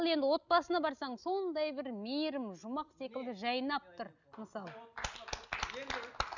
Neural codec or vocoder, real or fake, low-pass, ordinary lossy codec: none; real; 7.2 kHz; none